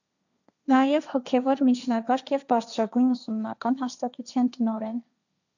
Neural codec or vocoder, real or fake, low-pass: codec, 16 kHz, 1.1 kbps, Voila-Tokenizer; fake; 7.2 kHz